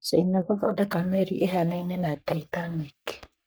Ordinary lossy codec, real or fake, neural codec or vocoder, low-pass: none; fake; codec, 44.1 kHz, 3.4 kbps, Pupu-Codec; none